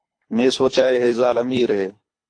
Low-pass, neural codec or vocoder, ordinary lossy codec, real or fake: 9.9 kHz; codec, 24 kHz, 3 kbps, HILCodec; AAC, 48 kbps; fake